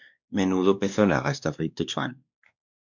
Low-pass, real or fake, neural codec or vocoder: 7.2 kHz; fake; codec, 16 kHz, 2 kbps, X-Codec, WavLM features, trained on Multilingual LibriSpeech